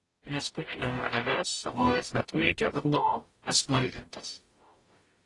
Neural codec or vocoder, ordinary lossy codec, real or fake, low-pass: codec, 44.1 kHz, 0.9 kbps, DAC; AAC, 32 kbps; fake; 10.8 kHz